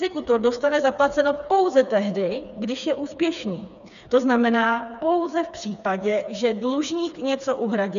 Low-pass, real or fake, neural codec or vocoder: 7.2 kHz; fake; codec, 16 kHz, 4 kbps, FreqCodec, smaller model